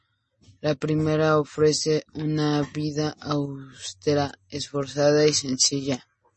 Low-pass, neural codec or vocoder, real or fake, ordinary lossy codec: 10.8 kHz; none; real; MP3, 32 kbps